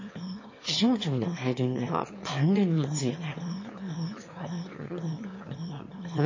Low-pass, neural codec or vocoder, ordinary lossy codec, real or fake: 7.2 kHz; autoencoder, 22.05 kHz, a latent of 192 numbers a frame, VITS, trained on one speaker; MP3, 32 kbps; fake